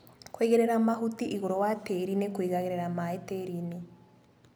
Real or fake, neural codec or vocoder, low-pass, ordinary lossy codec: real; none; none; none